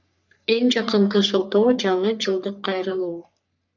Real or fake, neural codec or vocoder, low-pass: fake; codec, 44.1 kHz, 3.4 kbps, Pupu-Codec; 7.2 kHz